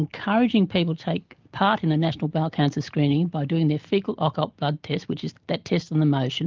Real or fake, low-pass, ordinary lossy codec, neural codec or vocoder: real; 7.2 kHz; Opus, 32 kbps; none